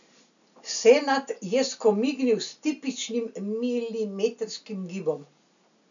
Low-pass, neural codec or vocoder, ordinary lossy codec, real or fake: 7.2 kHz; none; none; real